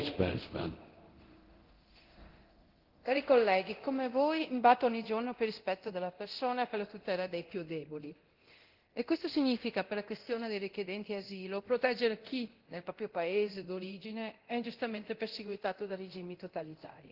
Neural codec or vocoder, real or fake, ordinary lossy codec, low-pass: codec, 24 kHz, 0.9 kbps, DualCodec; fake; Opus, 16 kbps; 5.4 kHz